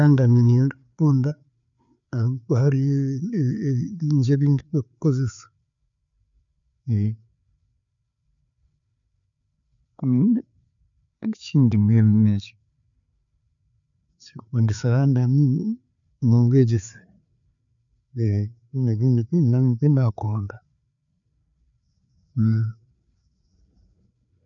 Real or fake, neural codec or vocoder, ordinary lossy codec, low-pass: fake; codec, 16 kHz, 4 kbps, FreqCodec, larger model; none; 7.2 kHz